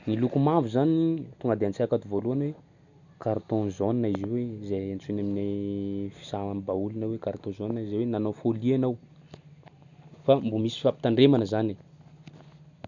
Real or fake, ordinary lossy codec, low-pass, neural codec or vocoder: real; none; 7.2 kHz; none